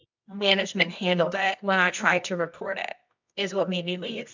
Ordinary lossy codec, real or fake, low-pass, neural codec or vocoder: MP3, 64 kbps; fake; 7.2 kHz; codec, 24 kHz, 0.9 kbps, WavTokenizer, medium music audio release